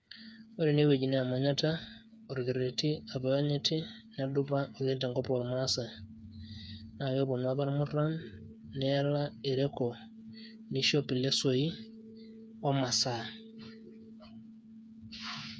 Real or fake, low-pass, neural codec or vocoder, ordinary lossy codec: fake; none; codec, 16 kHz, 8 kbps, FreqCodec, smaller model; none